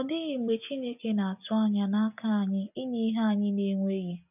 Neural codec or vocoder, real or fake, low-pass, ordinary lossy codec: none; real; 3.6 kHz; none